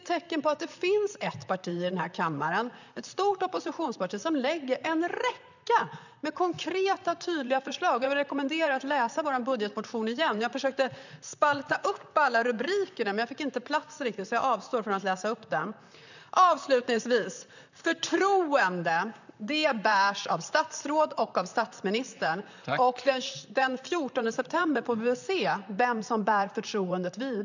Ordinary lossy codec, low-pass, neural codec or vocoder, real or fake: none; 7.2 kHz; vocoder, 44.1 kHz, 128 mel bands, Pupu-Vocoder; fake